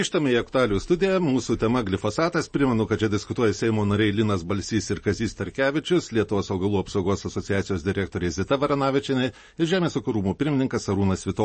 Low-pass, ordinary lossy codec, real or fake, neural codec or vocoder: 10.8 kHz; MP3, 32 kbps; real; none